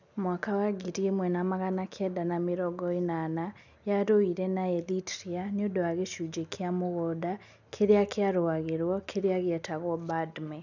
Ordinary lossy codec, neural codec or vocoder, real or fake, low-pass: none; none; real; 7.2 kHz